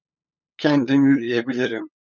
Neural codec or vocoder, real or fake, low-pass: codec, 16 kHz, 8 kbps, FunCodec, trained on LibriTTS, 25 frames a second; fake; 7.2 kHz